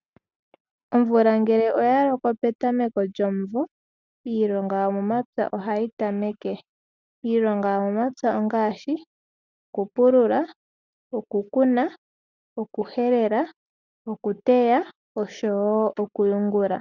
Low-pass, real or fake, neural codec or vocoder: 7.2 kHz; real; none